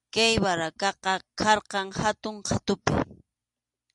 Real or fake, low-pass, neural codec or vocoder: real; 10.8 kHz; none